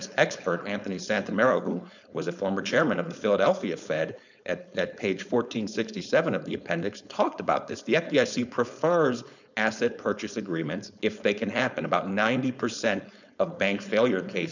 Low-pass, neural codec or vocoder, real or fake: 7.2 kHz; codec, 16 kHz, 4.8 kbps, FACodec; fake